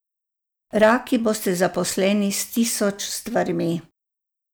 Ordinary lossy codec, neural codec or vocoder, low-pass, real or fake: none; none; none; real